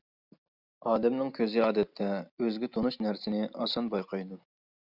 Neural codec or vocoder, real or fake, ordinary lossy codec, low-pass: none; real; Opus, 64 kbps; 5.4 kHz